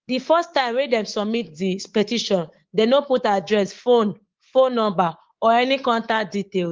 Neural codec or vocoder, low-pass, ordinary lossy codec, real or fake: codec, 24 kHz, 3.1 kbps, DualCodec; 7.2 kHz; Opus, 16 kbps; fake